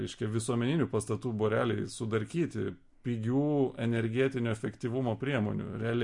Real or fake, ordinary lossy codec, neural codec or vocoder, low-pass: real; MP3, 48 kbps; none; 10.8 kHz